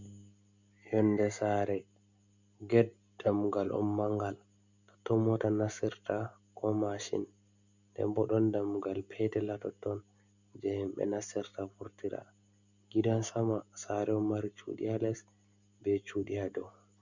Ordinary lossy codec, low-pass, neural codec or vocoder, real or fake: Opus, 64 kbps; 7.2 kHz; none; real